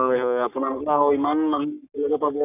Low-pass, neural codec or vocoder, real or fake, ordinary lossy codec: 3.6 kHz; codec, 44.1 kHz, 3.4 kbps, Pupu-Codec; fake; none